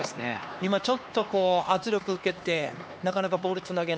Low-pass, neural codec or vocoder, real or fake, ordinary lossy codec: none; codec, 16 kHz, 2 kbps, X-Codec, HuBERT features, trained on LibriSpeech; fake; none